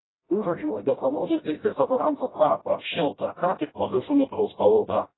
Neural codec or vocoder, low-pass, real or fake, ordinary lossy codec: codec, 16 kHz, 0.5 kbps, FreqCodec, smaller model; 7.2 kHz; fake; AAC, 16 kbps